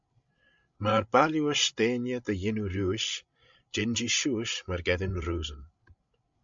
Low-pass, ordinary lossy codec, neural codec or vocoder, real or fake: 7.2 kHz; MP3, 64 kbps; codec, 16 kHz, 16 kbps, FreqCodec, larger model; fake